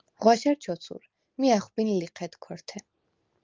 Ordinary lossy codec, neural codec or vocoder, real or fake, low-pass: Opus, 24 kbps; none; real; 7.2 kHz